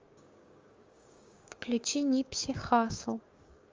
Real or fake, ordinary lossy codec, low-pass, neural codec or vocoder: fake; Opus, 32 kbps; 7.2 kHz; codec, 16 kHz, 6 kbps, DAC